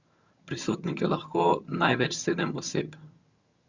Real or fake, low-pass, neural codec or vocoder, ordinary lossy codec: fake; 7.2 kHz; vocoder, 22.05 kHz, 80 mel bands, HiFi-GAN; Opus, 64 kbps